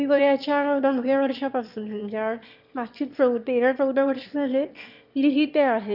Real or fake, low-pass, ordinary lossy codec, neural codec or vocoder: fake; 5.4 kHz; none; autoencoder, 22.05 kHz, a latent of 192 numbers a frame, VITS, trained on one speaker